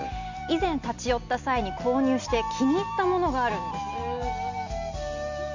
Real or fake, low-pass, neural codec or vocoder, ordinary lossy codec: real; 7.2 kHz; none; Opus, 64 kbps